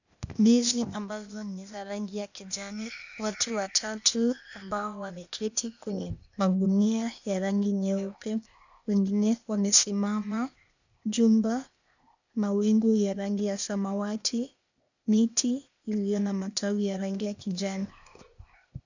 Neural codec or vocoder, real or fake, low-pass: codec, 16 kHz, 0.8 kbps, ZipCodec; fake; 7.2 kHz